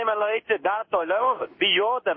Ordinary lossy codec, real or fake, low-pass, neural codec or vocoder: MP3, 24 kbps; fake; 7.2 kHz; codec, 16 kHz in and 24 kHz out, 1 kbps, XY-Tokenizer